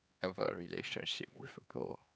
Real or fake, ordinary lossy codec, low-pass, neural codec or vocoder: fake; none; none; codec, 16 kHz, 4 kbps, X-Codec, HuBERT features, trained on LibriSpeech